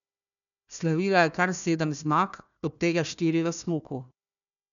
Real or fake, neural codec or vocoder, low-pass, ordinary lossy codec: fake; codec, 16 kHz, 1 kbps, FunCodec, trained on Chinese and English, 50 frames a second; 7.2 kHz; none